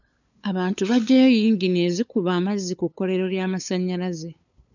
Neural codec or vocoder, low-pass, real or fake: codec, 16 kHz, 8 kbps, FunCodec, trained on LibriTTS, 25 frames a second; 7.2 kHz; fake